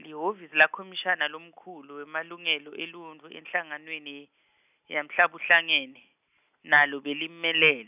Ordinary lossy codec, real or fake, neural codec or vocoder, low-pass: none; real; none; 3.6 kHz